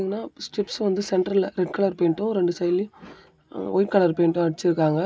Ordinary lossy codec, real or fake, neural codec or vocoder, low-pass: none; real; none; none